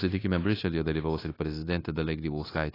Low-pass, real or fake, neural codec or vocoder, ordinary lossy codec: 5.4 kHz; fake; codec, 16 kHz, 0.9 kbps, LongCat-Audio-Codec; AAC, 24 kbps